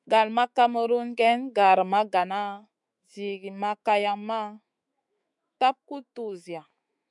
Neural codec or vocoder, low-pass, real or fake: autoencoder, 48 kHz, 128 numbers a frame, DAC-VAE, trained on Japanese speech; 10.8 kHz; fake